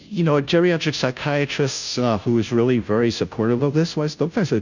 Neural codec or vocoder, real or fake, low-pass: codec, 16 kHz, 0.5 kbps, FunCodec, trained on Chinese and English, 25 frames a second; fake; 7.2 kHz